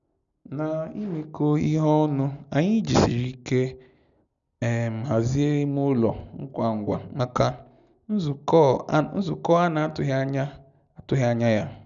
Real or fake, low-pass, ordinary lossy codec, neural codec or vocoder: real; 7.2 kHz; none; none